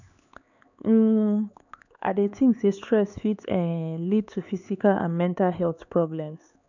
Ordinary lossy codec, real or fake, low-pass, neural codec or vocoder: none; fake; 7.2 kHz; codec, 16 kHz, 4 kbps, X-Codec, HuBERT features, trained on LibriSpeech